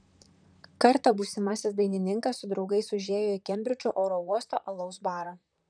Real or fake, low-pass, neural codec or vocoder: fake; 9.9 kHz; vocoder, 44.1 kHz, 128 mel bands, Pupu-Vocoder